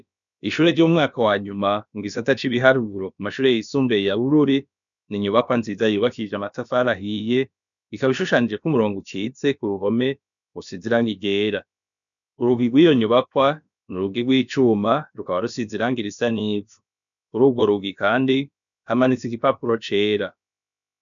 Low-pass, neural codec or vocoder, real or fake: 7.2 kHz; codec, 16 kHz, about 1 kbps, DyCAST, with the encoder's durations; fake